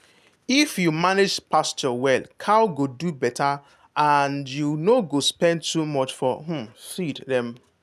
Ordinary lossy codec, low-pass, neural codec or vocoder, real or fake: none; 14.4 kHz; none; real